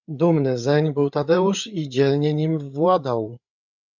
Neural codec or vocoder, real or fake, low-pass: codec, 16 kHz, 8 kbps, FreqCodec, larger model; fake; 7.2 kHz